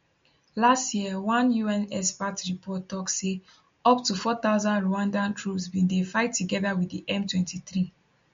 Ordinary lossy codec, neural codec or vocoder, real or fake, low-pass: MP3, 48 kbps; none; real; 7.2 kHz